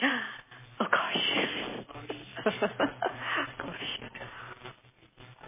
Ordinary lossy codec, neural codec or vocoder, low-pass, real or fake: MP3, 16 kbps; none; 3.6 kHz; real